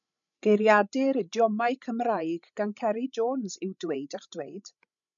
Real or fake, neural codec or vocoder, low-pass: fake; codec, 16 kHz, 16 kbps, FreqCodec, larger model; 7.2 kHz